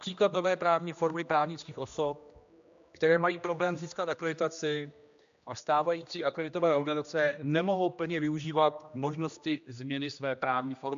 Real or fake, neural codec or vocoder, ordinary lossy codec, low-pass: fake; codec, 16 kHz, 1 kbps, X-Codec, HuBERT features, trained on general audio; MP3, 64 kbps; 7.2 kHz